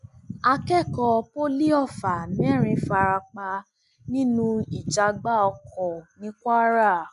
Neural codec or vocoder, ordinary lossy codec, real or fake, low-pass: none; none; real; 10.8 kHz